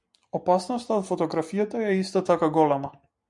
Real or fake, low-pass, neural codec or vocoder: real; 10.8 kHz; none